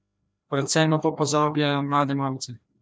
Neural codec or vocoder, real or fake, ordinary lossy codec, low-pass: codec, 16 kHz, 1 kbps, FreqCodec, larger model; fake; none; none